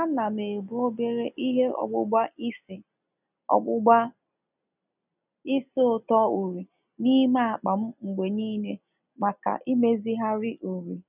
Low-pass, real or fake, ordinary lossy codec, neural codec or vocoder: 3.6 kHz; real; none; none